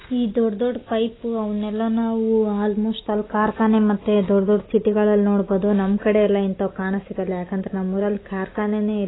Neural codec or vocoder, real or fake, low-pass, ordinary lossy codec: none; real; 7.2 kHz; AAC, 16 kbps